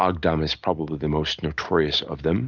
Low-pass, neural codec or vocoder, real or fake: 7.2 kHz; none; real